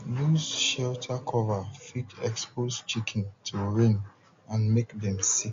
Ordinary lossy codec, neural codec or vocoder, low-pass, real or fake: MP3, 48 kbps; none; 7.2 kHz; real